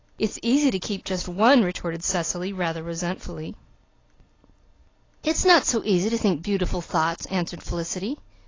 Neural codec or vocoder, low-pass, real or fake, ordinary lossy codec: none; 7.2 kHz; real; AAC, 32 kbps